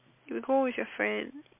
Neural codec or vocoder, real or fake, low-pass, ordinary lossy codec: none; real; 3.6 kHz; MP3, 32 kbps